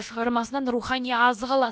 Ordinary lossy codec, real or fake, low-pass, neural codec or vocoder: none; fake; none; codec, 16 kHz, about 1 kbps, DyCAST, with the encoder's durations